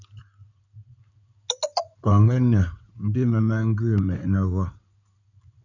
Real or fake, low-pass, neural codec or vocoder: fake; 7.2 kHz; codec, 16 kHz in and 24 kHz out, 2.2 kbps, FireRedTTS-2 codec